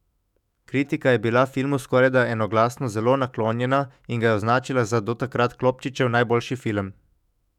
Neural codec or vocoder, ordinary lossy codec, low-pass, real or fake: autoencoder, 48 kHz, 128 numbers a frame, DAC-VAE, trained on Japanese speech; none; 19.8 kHz; fake